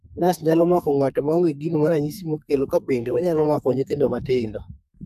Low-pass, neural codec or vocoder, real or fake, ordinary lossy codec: 14.4 kHz; codec, 32 kHz, 1.9 kbps, SNAC; fake; none